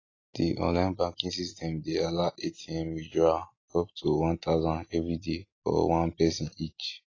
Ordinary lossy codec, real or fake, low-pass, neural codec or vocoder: AAC, 32 kbps; real; 7.2 kHz; none